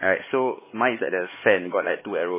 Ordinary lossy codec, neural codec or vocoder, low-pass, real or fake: MP3, 16 kbps; codec, 16 kHz, 4 kbps, X-Codec, HuBERT features, trained on LibriSpeech; 3.6 kHz; fake